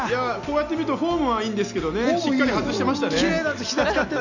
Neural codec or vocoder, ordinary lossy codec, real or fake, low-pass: none; none; real; 7.2 kHz